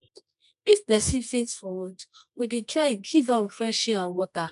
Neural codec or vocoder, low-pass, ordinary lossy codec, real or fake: codec, 24 kHz, 0.9 kbps, WavTokenizer, medium music audio release; 10.8 kHz; none; fake